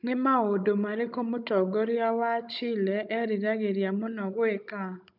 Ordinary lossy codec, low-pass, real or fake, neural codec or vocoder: none; 5.4 kHz; fake; codec, 16 kHz, 16 kbps, FunCodec, trained on Chinese and English, 50 frames a second